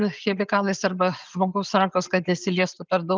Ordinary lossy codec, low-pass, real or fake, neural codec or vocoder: Opus, 32 kbps; 7.2 kHz; real; none